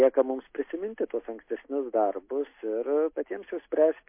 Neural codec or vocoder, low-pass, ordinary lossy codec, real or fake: none; 3.6 kHz; MP3, 24 kbps; real